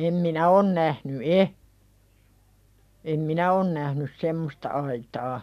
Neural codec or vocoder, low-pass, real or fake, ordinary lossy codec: none; 14.4 kHz; real; none